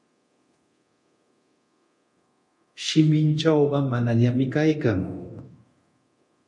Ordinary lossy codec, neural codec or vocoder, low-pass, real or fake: MP3, 48 kbps; codec, 24 kHz, 0.9 kbps, DualCodec; 10.8 kHz; fake